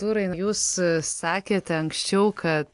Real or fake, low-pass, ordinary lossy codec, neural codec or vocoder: real; 10.8 kHz; AAC, 64 kbps; none